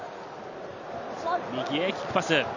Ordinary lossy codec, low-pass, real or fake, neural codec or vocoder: none; 7.2 kHz; real; none